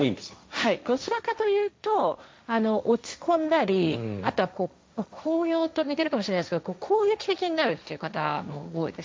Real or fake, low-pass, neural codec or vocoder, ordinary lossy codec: fake; none; codec, 16 kHz, 1.1 kbps, Voila-Tokenizer; none